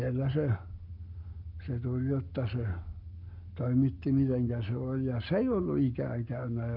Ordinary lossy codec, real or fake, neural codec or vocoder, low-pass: none; fake; codec, 44.1 kHz, 7.8 kbps, Pupu-Codec; 5.4 kHz